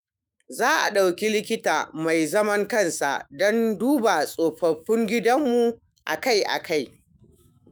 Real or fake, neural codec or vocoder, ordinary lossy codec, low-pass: fake; autoencoder, 48 kHz, 128 numbers a frame, DAC-VAE, trained on Japanese speech; none; none